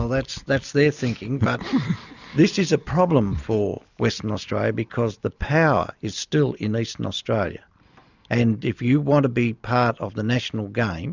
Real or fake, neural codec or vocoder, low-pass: real; none; 7.2 kHz